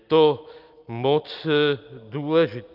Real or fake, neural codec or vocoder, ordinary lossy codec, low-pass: real; none; Opus, 24 kbps; 5.4 kHz